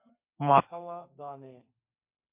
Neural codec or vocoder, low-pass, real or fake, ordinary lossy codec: codec, 32 kHz, 1.9 kbps, SNAC; 3.6 kHz; fake; MP3, 32 kbps